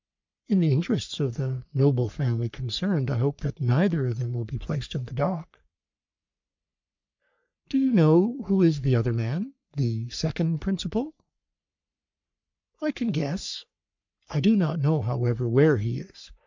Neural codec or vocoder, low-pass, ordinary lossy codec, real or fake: codec, 44.1 kHz, 3.4 kbps, Pupu-Codec; 7.2 kHz; MP3, 64 kbps; fake